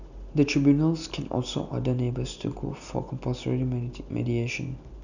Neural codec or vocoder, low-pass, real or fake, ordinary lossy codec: none; 7.2 kHz; real; none